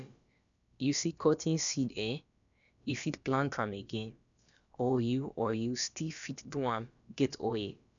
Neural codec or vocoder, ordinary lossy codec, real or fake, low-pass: codec, 16 kHz, about 1 kbps, DyCAST, with the encoder's durations; none; fake; 7.2 kHz